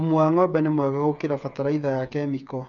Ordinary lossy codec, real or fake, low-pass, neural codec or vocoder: none; fake; 7.2 kHz; codec, 16 kHz, 8 kbps, FreqCodec, smaller model